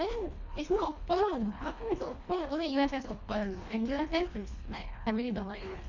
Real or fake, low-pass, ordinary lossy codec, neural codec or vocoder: fake; 7.2 kHz; Opus, 64 kbps; codec, 24 kHz, 1.5 kbps, HILCodec